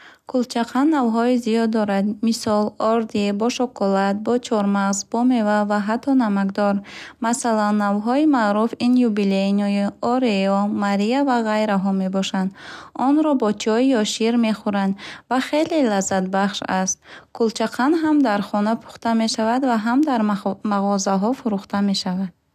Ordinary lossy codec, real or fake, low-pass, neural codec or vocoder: none; real; 14.4 kHz; none